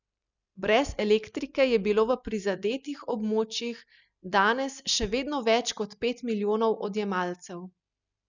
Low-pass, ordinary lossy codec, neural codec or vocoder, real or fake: 7.2 kHz; none; none; real